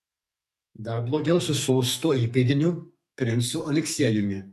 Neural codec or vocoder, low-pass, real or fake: codec, 44.1 kHz, 2.6 kbps, SNAC; 14.4 kHz; fake